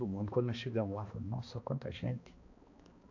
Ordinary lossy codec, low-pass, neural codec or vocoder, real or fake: AAC, 48 kbps; 7.2 kHz; codec, 16 kHz, 2 kbps, X-Codec, HuBERT features, trained on balanced general audio; fake